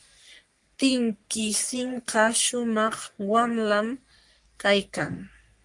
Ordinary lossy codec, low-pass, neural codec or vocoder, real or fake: Opus, 32 kbps; 10.8 kHz; codec, 44.1 kHz, 3.4 kbps, Pupu-Codec; fake